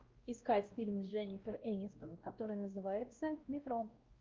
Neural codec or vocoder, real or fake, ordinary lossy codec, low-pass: codec, 16 kHz, 1 kbps, X-Codec, WavLM features, trained on Multilingual LibriSpeech; fake; Opus, 32 kbps; 7.2 kHz